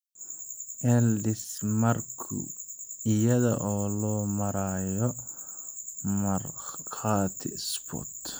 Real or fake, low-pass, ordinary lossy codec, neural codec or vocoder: real; none; none; none